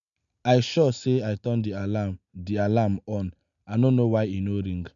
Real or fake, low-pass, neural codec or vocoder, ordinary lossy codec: real; 7.2 kHz; none; none